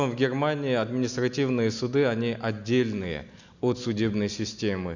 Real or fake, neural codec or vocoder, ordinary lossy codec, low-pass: real; none; none; 7.2 kHz